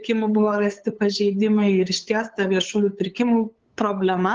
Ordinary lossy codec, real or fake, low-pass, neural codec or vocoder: Opus, 16 kbps; fake; 7.2 kHz; codec, 16 kHz, 8 kbps, FunCodec, trained on LibriTTS, 25 frames a second